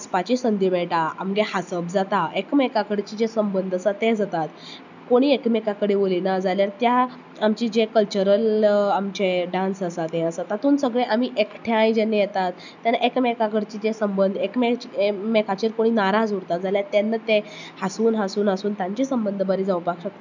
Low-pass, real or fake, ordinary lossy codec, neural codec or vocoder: 7.2 kHz; real; none; none